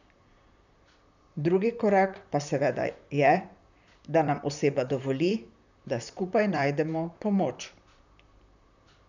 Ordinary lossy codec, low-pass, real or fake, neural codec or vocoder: none; 7.2 kHz; fake; vocoder, 44.1 kHz, 128 mel bands, Pupu-Vocoder